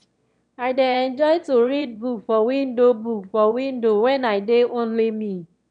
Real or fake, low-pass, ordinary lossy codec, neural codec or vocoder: fake; 9.9 kHz; none; autoencoder, 22.05 kHz, a latent of 192 numbers a frame, VITS, trained on one speaker